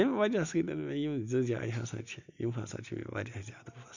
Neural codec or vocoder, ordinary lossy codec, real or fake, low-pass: none; none; real; 7.2 kHz